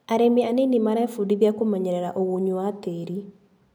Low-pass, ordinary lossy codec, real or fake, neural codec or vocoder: none; none; real; none